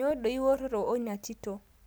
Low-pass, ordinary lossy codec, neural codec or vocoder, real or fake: none; none; none; real